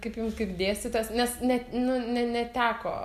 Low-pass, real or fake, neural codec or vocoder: 14.4 kHz; real; none